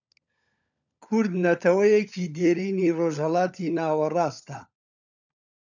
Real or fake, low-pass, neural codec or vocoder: fake; 7.2 kHz; codec, 16 kHz, 16 kbps, FunCodec, trained on LibriTTS, 50 frames a second